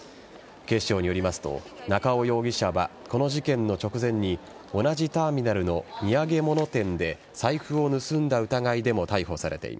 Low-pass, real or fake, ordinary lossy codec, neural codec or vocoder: none; real; none; none